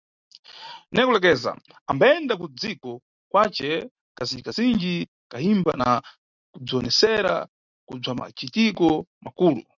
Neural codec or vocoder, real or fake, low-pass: none; real; 7.2 kHz